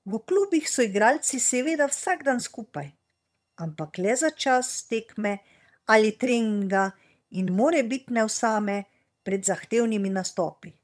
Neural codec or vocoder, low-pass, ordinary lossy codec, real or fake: vocoder, 22.05 kHz, 80 mel bands, HiFi-GAN; none; none; fake